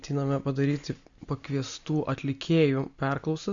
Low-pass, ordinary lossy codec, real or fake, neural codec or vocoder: 7.2 kHz; Opus, 64 kbps; real; none